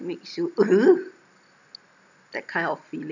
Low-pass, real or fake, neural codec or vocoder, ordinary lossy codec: 7.2 kHz; real; none; none